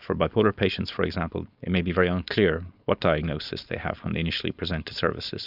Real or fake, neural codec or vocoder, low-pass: fake; codec, 16 kHz, 4.8 kbps, FACodec; 5.4 kHz